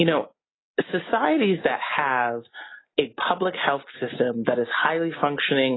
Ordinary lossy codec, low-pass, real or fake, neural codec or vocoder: AAC, 16 kbps; 7.2 kHz; real; none